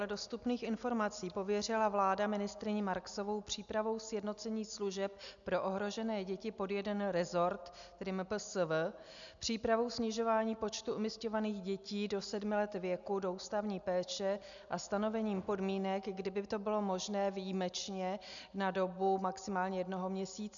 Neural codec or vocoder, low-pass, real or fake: none; 7.2 kHz; real